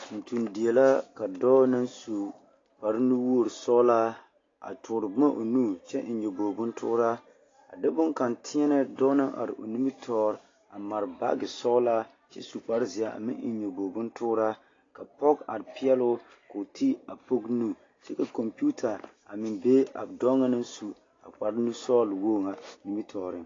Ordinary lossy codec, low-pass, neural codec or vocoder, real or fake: AAC, 32 kbps; 7.2 kHz; none; real